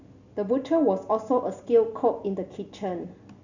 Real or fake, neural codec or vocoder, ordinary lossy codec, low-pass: real; none; none; 7.2 kHz